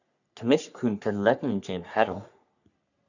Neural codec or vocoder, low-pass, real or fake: codec, 44.1 kHz, 3.4 kbps, Pupu-Codec; 7.2 kHz; fake